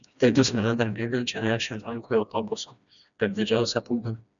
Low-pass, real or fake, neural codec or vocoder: 7.2 kHz; fake; codec, 16 kHz, 1 kbps, FreqCodec, smaller model